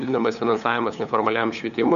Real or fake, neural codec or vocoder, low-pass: fake; codec, 16 kHz, 8 kbps, FunCodec, trained on LibriTTS, 25 frames a second; 7.2 kHz